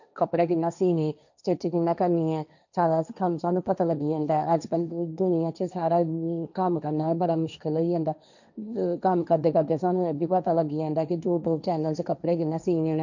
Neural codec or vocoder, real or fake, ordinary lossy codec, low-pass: codec, 16 kHz, 1.1 kbps, Voila-Tokenizer; fake; none; 7.2 kHz